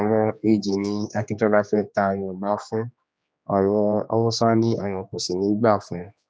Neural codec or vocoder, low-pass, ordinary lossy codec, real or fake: codec, 16 kHz, 2 kbps, X-Codec, HuBERT features, trained on general audio; none; none; fake